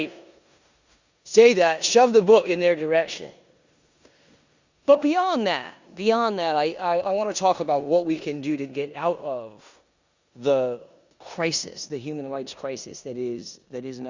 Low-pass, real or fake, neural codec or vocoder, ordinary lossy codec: 7.2 kHz; fake; codec, 16 kHz in and 24 kHz out, 0.9 kbps, LongCat-Audio-Codec, four codebook decoder; Opus, 64 kbps